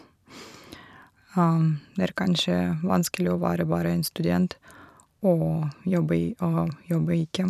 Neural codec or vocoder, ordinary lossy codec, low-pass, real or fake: vocoder, 44.1 kHz, 128 mel bands every 256 samples, BigVGAN v2; none; 14.4 kHz; fake